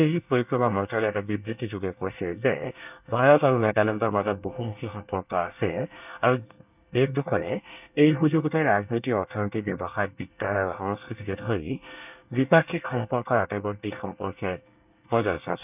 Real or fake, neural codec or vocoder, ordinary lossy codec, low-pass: fake; codec, 24 kHz, 1 kbps, SNAC; none; 3.6 kHz